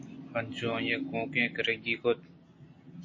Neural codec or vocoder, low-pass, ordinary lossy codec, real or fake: none; 7.2 kHz; MP3, 32 kbps; real